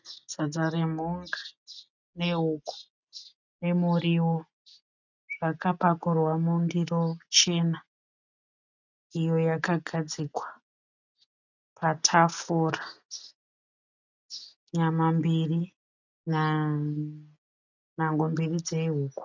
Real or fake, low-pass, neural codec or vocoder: real; 7.2 kHz; none